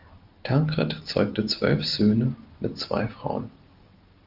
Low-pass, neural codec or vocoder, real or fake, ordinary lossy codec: 5.4 kHz; none; real; Opus, 32 kbps